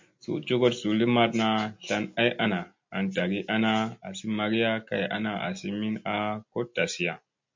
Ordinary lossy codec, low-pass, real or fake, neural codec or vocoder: MP3, 48 kbps; 7.2 kHz; real; none